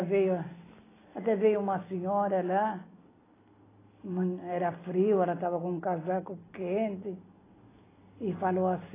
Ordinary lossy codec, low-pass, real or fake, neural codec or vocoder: AAC, 16 kbps; 3.6 kHz; real; none